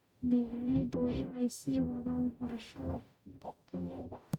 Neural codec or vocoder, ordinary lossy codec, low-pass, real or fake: codec, 44.1 kHz, 0.9 kbps, DAC; MP3, 96 kbps; 19.8 kHz; fake